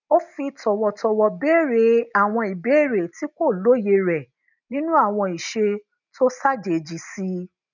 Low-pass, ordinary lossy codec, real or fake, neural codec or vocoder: 7.2 kHz; none; real; none